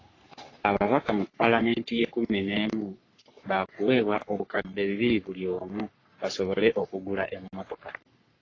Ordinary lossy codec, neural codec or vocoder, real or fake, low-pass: AAC, 32 kbps; codec, 44.1 kHz, 3.4 kbps, Pupu-Codec; fake; 7.2 kHz